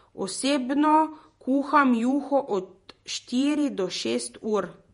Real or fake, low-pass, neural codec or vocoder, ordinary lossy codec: fake; 19.8 kHz; vocoder, 48 kHz, 128 mel bands, Vocos; MP3, 48 kbps